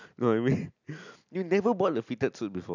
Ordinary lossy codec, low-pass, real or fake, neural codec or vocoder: none; 7.2 kHz; real; none